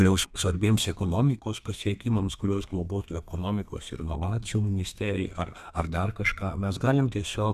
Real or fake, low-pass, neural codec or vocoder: fake; 14.4 kHz; codec, 32 kHz, 1.9 kbps, SNAC